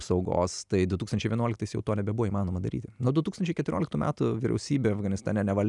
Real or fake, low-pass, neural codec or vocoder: real; 10.8 kHz; none